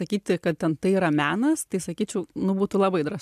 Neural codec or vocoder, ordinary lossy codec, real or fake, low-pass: none; AAC, 96 kbps; real; 14.4 kHz